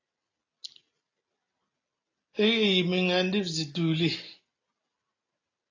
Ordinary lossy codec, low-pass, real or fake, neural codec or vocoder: AAC, 32 kbps; 7.2 kHz; real; none